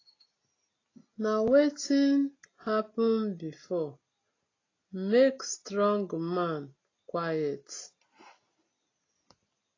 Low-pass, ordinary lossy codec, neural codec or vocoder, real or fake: 7.2 kHz; AAC, 32 kbps; none; real